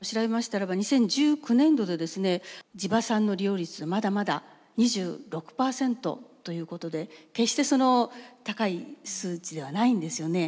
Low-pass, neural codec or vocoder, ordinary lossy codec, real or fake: none; none; none; real